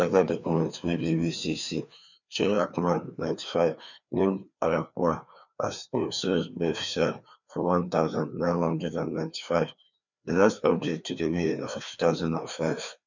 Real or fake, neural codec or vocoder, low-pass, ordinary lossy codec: fake; codec, 16 kHz, 2 kbps, FreqCodec, larger model; 7.2 kHz; none